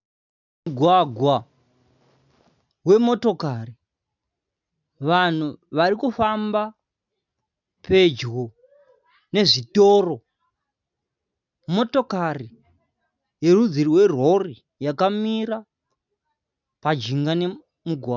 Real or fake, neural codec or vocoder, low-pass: real; none; 7.2 kHz